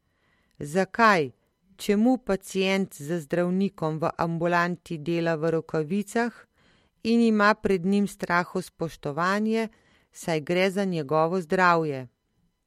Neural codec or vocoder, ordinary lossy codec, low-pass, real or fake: none; MP3, 64 kbps; 19.8 kHz; real